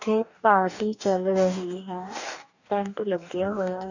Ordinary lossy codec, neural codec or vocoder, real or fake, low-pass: none; codec, 44.1 kHz, 2.6 kbps, DAC; fake; 7.2 kHz